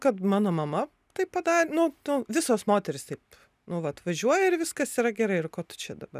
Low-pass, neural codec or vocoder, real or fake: 14.4 kHz; none; real